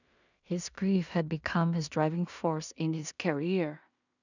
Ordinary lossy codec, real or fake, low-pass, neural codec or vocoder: none; fake; 7.2 kHz; codec, 16 kHz in and 24 kHz out, 0.4 kbps, LongCat-Audio-Codec, two codebook decoder